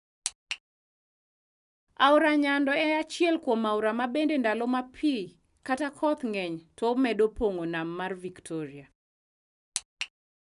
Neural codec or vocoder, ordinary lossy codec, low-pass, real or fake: none; none; 10.8 kHz; real